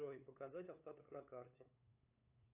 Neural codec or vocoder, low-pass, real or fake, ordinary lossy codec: codec, 16 kHz, 8 kbps, FunCodec, trained on LibriTTS, 25 frames a second; 3.6 kHz; fake; AAC, 32 kbps